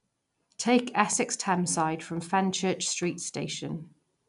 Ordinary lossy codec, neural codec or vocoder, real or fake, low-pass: none; none; real; 10.8 kHz